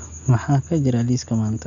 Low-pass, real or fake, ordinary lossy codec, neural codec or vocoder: 7.2 kHz; real; MP3, 96 kbps; none